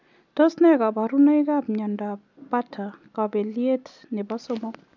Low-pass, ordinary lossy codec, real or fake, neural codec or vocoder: 7.2 kHz; none; real; none